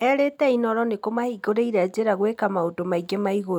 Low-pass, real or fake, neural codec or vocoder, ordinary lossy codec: 19.8 kHz; real; none; none